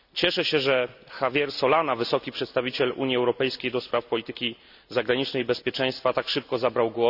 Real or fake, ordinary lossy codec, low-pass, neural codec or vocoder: real; none; 5.4 kHz; none